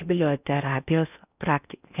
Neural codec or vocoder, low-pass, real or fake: codec, 16 kHz in and 24 kHz out, 0.6 kbps, FocalCodec, streaming, 4096 codes; 3.6 kHz; fake